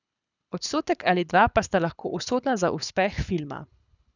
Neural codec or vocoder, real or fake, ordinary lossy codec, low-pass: codec, 24 kHz, 6 kbps, HILCodec; fake; none; 7.2 kHz